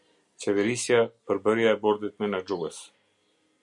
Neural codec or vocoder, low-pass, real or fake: none; 10.8 kHz; real